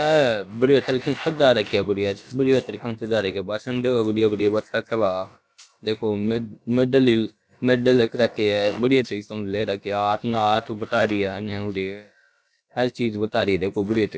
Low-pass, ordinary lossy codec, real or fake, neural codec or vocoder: none; none; fake; codec, 16 kHz, about 1 kbps, DyCAST, with the encoder's durations